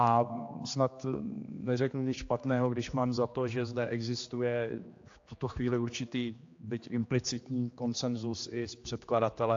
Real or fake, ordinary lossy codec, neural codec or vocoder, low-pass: fake; AAC, 48 kbps; codec, 16 kHz, 2 kbps, X-Codec, HuBERT features, trained on general audio; 7.2 kHz